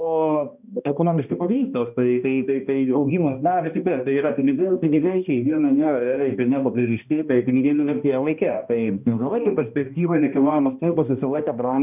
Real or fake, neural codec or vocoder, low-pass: fake; codec, 16 kHz, 1 kbps, X-Codec, HuBERT features, trained on balanced general audio; 3.6 kHz